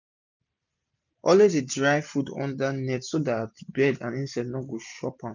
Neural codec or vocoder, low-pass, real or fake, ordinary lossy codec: none; 7.2 kHz; real; none